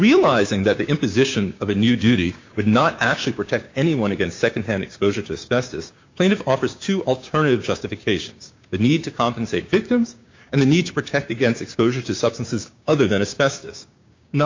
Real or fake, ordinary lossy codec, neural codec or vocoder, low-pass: fake; MP3, 64 kbps; codec, 16 kHz, 6 kbps, DAC; 7.2 kHz